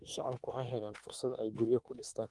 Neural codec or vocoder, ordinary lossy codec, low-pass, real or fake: autoencoder, 48 kHz, 32 numbers a frame, DAC-VAE, trained on Japanese speech; Opus, 24 kbps; 10.8 kHz; fake